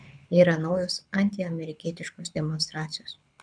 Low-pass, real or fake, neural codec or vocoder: 9.9 kHz; fake; codec, 24 kHz, 6 kbps, HILCodec